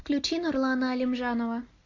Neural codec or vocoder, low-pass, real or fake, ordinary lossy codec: none; 7.2 kHz; real; AAC, 32 kbps